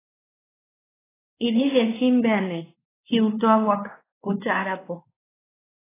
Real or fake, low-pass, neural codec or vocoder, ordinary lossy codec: fake; 3.6 kHz; codec, 16 kHz, 2 kbps, X-Codec, WavLM features, trained on Multilingual LibriSpeech; AAC, 16 kbps